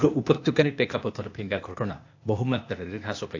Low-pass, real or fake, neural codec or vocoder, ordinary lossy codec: 7.2 kHz; fake; codec, 16 kHz, 0.8 kbps, ZipCodec; AAC, 48 kbps